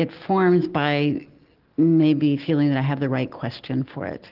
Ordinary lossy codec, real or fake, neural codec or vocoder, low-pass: Opus, 16 kbps; real; none; 5.4 kHz